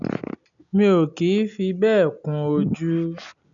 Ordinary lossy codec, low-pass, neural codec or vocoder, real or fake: none; 7.2 kHz; none; real